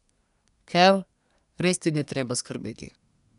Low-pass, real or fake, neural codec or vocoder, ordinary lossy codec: 10.8 kHz; fake; codec, 24 kHz, 1 kbps, SNAC; none